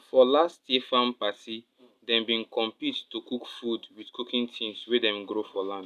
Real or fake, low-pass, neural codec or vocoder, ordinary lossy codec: real; 14.4 kHz; none; none